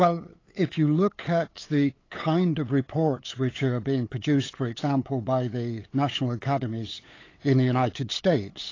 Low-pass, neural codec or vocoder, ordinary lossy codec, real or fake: 7.2 kHz; codec, 16 kHz, 8 kbps, FunCodec, trained on LibriTTS, 25 frames a second; AAC, 32 kbps; fake